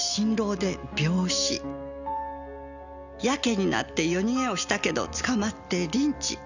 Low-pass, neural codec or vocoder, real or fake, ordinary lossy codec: 7.2 kHz; none; real; none